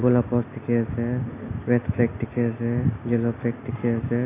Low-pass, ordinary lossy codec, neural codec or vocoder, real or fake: 3.6 kHz; AAC, 24 kbps; none; real